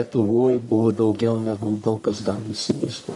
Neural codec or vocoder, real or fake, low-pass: codec, 44.1 kHz, 1.7 kbps, Pupu-Codec; fake; 10.8 kHz